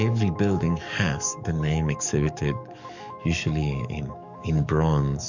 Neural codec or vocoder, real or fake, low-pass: codec, 44.1 kHz, 7.8 kbps, DAC; fake; 7.2 kHz